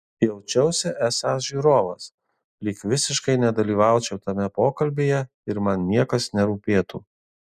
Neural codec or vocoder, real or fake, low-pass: none; real; 14.4 kHz